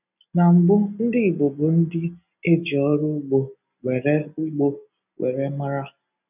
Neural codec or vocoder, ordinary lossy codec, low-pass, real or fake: none; none; 3.6 kHz; real